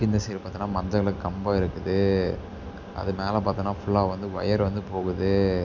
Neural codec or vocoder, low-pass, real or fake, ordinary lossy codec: none; 7.2 kHz; real; MP3, 64 kbps